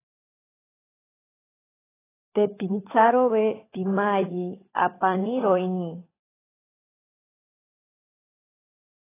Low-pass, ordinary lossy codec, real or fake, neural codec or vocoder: 3.6 kHz; AAC, 16 kbps; fake; codec, 16 kHz, 16 kbps, FunCodec, trained on LibriTTS, 50 frames a second